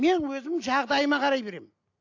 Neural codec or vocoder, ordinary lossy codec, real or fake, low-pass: none; AAC, 48 kbps; real; 7.2 kHz